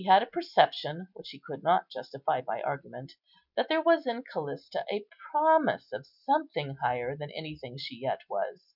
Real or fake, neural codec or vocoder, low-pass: real; none; 5.4 kHz